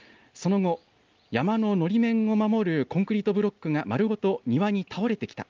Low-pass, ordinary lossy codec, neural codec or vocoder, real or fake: 7.2 kHz; Opus, 24 kbps; none; real